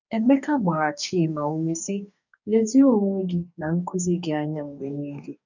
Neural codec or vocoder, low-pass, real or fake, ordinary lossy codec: codec, 44.1 kHz, 2.6 kbps, DAC; 7.2 kHz; fake; none